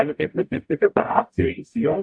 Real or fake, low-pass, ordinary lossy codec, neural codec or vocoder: fake; 9.9 kHz; AAC, 64 kbps; codec, 44.1 kHz, 0.9 kbps, DAC